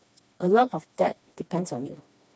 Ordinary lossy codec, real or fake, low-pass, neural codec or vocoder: none; fake; none; codec, 16 kHz, 2 kbps, FreqCodec, smaller model